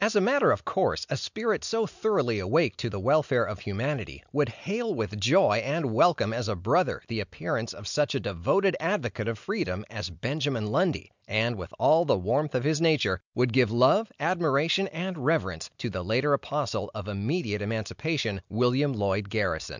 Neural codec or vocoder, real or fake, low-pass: none; real; 7.2 kHz